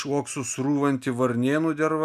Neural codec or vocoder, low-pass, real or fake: none; 14.4 kHz; real